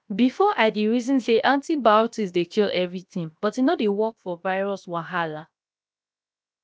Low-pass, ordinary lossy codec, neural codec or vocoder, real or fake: none; none; codec, 16 kHz, 0.7 kbps, FocalCodec; fake